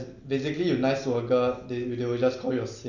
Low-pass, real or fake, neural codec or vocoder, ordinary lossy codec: 7.2 kHz; real; none; none